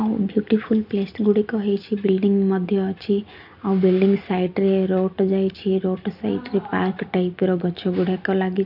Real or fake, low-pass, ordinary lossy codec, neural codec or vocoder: real; 5.4 kHz; none; none